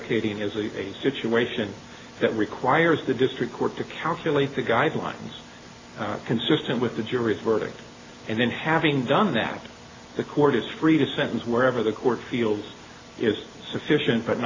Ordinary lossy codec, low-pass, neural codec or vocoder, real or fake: AAC, 32 kbps; 7.2 kHz; none; real